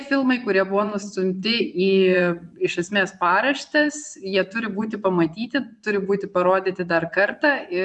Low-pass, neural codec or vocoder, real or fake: 10.8 kHz; vocoder, 24 kHz, 100 mel bands, Vocos; fake